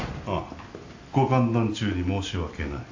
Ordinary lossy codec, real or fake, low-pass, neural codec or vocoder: AAC, 48 kbps; real; 7.2 kHz; none